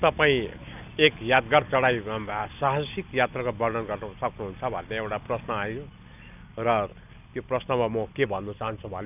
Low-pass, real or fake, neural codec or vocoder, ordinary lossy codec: 3.6 kHz; real; none; none